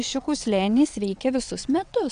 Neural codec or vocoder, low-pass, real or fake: vocoder, 22.05 kHz, 80 mel bands, WaveNeXt; 9.9 kHz; fake